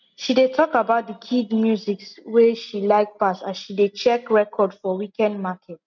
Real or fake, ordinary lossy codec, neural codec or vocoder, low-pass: real; MP3, 64 kbps; none; 7.2 kHz